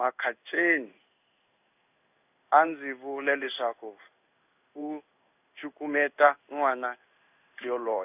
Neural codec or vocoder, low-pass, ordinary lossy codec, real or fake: codec, 16 kHz in and 24 kHz out, 1 kbps, XY-Tokenizer; 3.6 kHz; none; fake